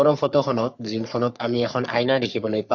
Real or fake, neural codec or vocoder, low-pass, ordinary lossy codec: fake; codec, 44.1 kHz, 3.4 kbps, Pupu-Codec; 7.2 kHz; none